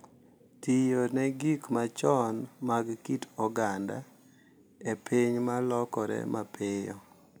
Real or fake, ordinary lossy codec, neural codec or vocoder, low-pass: real; none; none; none